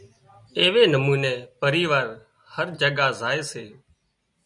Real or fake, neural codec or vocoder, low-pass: real; none; 10.8 kHz